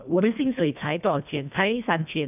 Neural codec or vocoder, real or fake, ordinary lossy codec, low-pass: codec, 24 kHz, 1.5 kbps, HILCodec; fake; none; 3.6 kHz